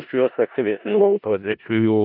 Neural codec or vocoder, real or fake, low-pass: codec, 16 kHz in and 24 kHz out, 0.4 kbps, LongCat-Audio-Codec, four codebook decoder; fake; 5.4 kHz